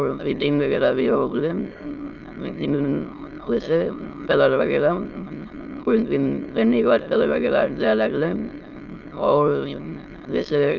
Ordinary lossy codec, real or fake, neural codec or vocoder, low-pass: Opus, 24 kbps; fake; autoencoder, 22.05 kHz, a latent of 192 numbers a frame, VITS, trained on many speakers; 7.2 kHz